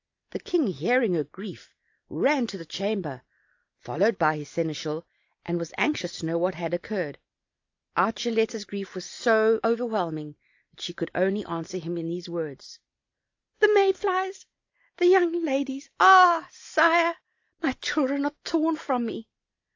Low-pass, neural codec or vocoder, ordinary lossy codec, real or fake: 7.2 kHz; none; AAC, 48 kbps; real